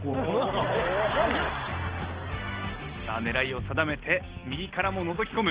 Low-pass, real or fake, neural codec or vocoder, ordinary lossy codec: 3.6 kHz; real; none; Opus, 16 kbps